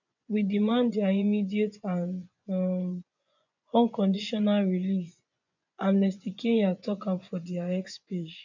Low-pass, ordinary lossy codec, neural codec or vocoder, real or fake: 7.2 kHz; none; vocoder, 24 kHz, 100 mel bands, Vocos; fake